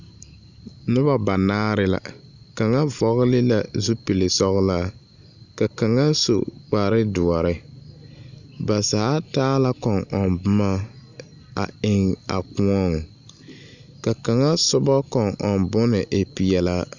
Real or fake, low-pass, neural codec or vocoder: real; 7.2 kHz; none